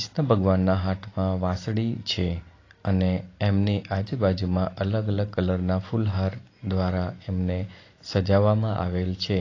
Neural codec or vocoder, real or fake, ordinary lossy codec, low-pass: none; real; AAC, 32 kbps; 7.2 kHz